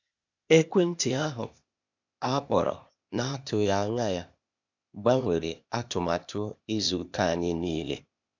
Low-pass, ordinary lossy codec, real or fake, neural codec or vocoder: 7.2 kHz; none; fake; codec, 16 kHz, 0.8 kbps, ZipCodec